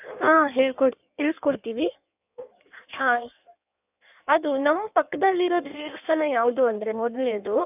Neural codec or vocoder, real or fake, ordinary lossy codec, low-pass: codec, 16 kHz in and 24 kHz out, 1.1 kbps, FireRedTTS-2 codec; fake; none; 3.6 kHz